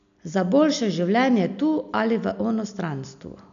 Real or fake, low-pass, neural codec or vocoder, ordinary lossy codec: real; 7.2 kHz; none; MP3, 96 kbps